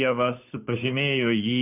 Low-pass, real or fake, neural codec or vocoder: 3.6 kHz; fake; codec, 16 kHz, 2 kbps, FunCodec, trained on Chinese and English, 25 frames a second